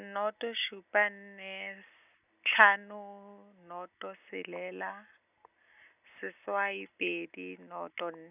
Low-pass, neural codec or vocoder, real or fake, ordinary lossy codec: 3.6 kHz; none; real; none